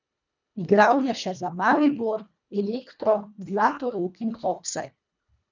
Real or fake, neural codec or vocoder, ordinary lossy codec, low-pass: fake; codec, 24 kHz, 1.5 kbps, HILCodec; none; 7.2 kHz